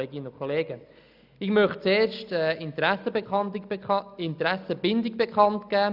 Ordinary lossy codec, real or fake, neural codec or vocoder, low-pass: none; real; none; 5.4 kHz